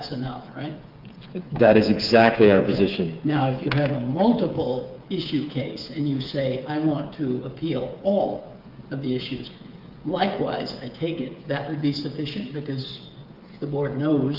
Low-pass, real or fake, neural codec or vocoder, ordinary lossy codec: 5.4 kHz; fake; codec, 16 kHz, 8 kbps, FreqCodec, smaller model; Opus, 24 kbps